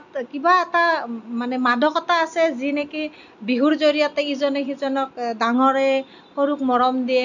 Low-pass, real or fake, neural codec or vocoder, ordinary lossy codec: 7.2 kHz; real; none; none